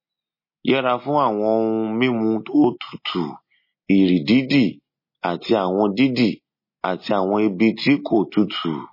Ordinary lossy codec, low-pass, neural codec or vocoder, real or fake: MP3, 24 kbps; 5.4 kHz; none; real